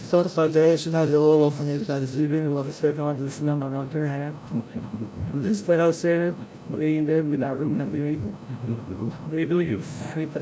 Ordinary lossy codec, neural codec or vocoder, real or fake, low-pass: none; codec, 16 kHz, 0.5 kbps, FreqCodec, larger model; fake; none